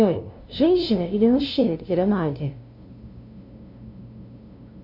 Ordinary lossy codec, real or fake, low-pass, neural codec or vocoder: AAC, 48 kbps; fake; 5.4 kHz; codec, 16 kHz, 0.5 kbps, FunCodec, trained on LibriTTS, 25 frames a second